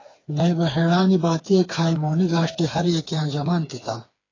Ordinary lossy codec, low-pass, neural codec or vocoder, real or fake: AAC, 32 kbps; 7.2 kHz; codec, 16 kHz, 4 kbps, FreqCodec, smaller model; fake